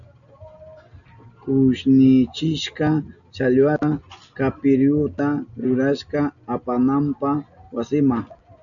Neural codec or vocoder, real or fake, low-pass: none; real; 7.2 kHz